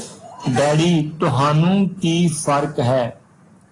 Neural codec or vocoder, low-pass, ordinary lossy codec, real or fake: none; 10.8 kHz; AAC, 32 kbps; real